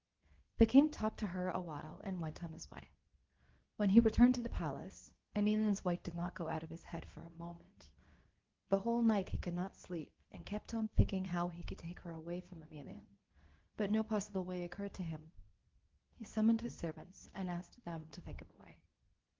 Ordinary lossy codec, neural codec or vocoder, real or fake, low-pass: Opus, 16 kbps; codec, 24 kHz, 0.9 kbps, WavTokenizer, medium speech release version 2; fake; 7.2 kHz